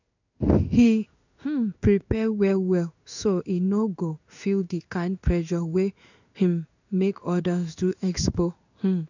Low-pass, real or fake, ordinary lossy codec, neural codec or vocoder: 7.2 kHz; fake; none; codec, 16 kHz in and 24 kHz out, 1 kbps, XY-Tokenizer